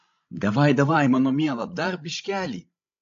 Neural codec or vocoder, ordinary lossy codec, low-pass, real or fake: codec, 16 kHz, 16 kbps, FreqCodec, larger model; AAC, 48 kbps; 7.2 kHz; fake